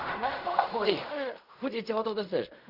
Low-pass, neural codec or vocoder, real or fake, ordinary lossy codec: 5.4 kHz; codec, 16 kHz in and 24 kHz out, 0.4 kbps, LongCat-Audio-Codec, fine tuned four codebook decoder; fake; none